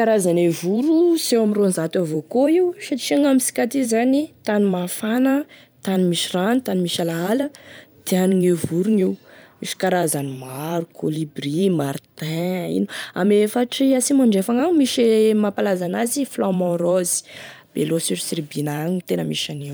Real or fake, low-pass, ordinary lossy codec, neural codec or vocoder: fake; none; none; vocoder, 44.1 kHz, 128 mel bands every 512 samples, BigVGAN v2